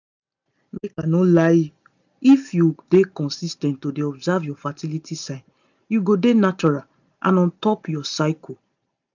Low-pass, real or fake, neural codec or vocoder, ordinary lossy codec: 7.2 kHz; real; none; none